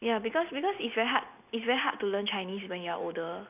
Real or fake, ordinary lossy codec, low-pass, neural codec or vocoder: real; none; 3.6 kHz; none